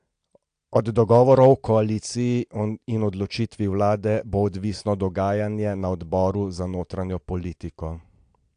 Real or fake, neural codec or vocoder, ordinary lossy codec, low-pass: real; none; AAC, 64 kbps; 9.9 kHz